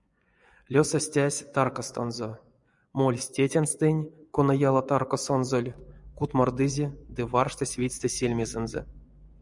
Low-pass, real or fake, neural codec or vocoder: 10.8 kHz; fake; vocoder, 24 kHz, 100 mel bands, Vocos